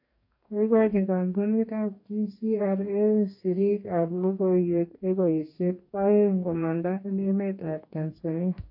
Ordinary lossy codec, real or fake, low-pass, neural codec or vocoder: AAC, 48 kbps; fake; 5.4 kHz; codec, 44.1 kHz, 2.6 kbps, DAC